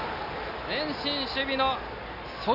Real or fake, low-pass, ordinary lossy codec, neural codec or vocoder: real; 5.4 kHz; none; none